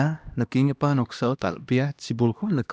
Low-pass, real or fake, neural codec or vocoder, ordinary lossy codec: none; fake; codec, 16 kHz, 1 kbps, X-Codec, HuBERT features, trained on LibriSpeech; none